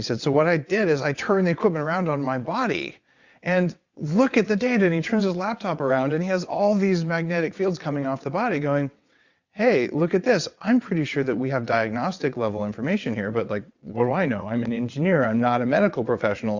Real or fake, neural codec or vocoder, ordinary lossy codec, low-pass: fake; vocoder, 22.05 kHz, 80 mel bands, WaveNeXt; Opus, 64 kbps; 7.2 kHz